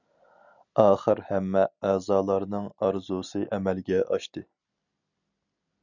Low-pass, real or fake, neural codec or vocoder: 7.2 kHz; real; none